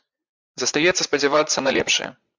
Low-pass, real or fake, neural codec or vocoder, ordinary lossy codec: 7.2 kHz; fake; vocoder, 44.1 kHz, 80 mel bands, Vocos; MP3, 64 kbps